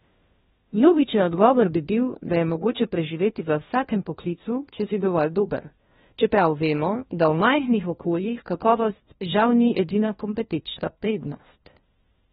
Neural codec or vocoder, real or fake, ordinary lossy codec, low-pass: codec, 16 kHz, 1 kbps, FunCodec, trained on LibriTTS, 50 frames a second; fake; AAC, 16 kbps; 7.2 kHz